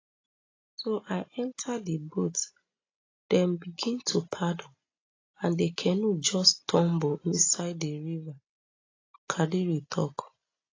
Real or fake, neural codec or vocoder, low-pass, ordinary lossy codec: real; none; 7.2 kHz; AAC, 32 kbps